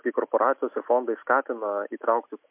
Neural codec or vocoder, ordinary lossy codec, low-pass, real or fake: none; MP3, 32 kbps; 3.6 kHz; real